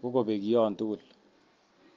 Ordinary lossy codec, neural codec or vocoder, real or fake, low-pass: Opus, 24 kbps; none; real; 7.2 kHz